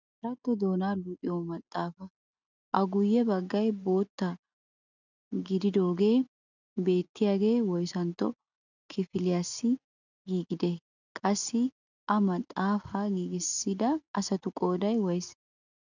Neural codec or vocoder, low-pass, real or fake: none; 7.2 kHz; real